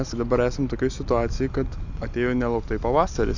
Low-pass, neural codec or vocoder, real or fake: 7.2 kHz; none; real